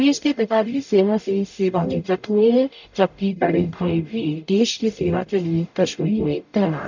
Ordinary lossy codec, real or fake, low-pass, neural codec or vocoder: none; fake; 7.2 kHz; codec, 44.1 kHz, 0.9 kbps, DAC